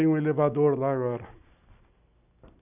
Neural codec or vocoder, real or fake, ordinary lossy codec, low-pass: none; real; none; 3.6 kHz